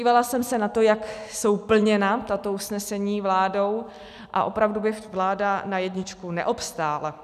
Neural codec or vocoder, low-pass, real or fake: none; 14.4 kHz; real